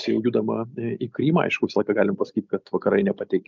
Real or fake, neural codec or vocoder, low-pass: real; none; 7.2 kHz